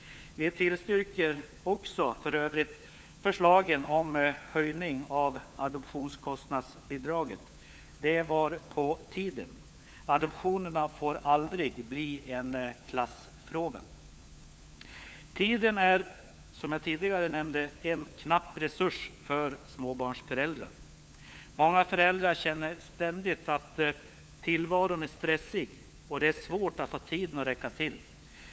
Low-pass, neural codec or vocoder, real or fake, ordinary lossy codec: none; codec, 16 kHz, 4 kbps, FunCodec, trained on LibriTTS, 50 frames a second; fake; none